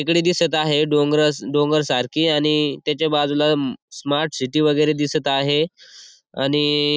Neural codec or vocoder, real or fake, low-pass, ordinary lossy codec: none; real; none; none